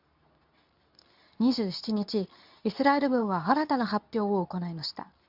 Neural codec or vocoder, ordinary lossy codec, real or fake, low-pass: codec, 24 kHz, 0.9 kbps, WavTokenizer, medium speech release version 2; none; fake; 5.4 kHz